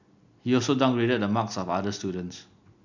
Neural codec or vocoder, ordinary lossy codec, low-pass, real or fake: none; none; 7.2 kHz; real